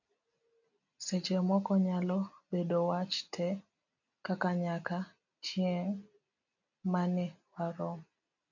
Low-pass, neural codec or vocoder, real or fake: 7.2 kHz; none; real